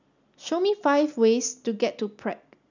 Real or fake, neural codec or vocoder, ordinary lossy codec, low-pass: real; none; none; 7.2 kHz